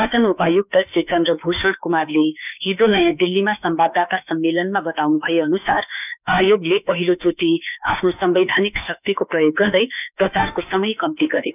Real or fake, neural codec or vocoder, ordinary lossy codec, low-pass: fake; autoencoder, 48 kHz, 32 numbers a frame, DAC-VAE, trained on Japanese speech; none; 3.6 kHz